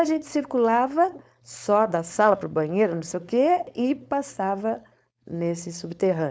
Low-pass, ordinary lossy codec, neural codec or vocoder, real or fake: none; none; codec, 16 kHz, 4.8 kbps, FACodec; fake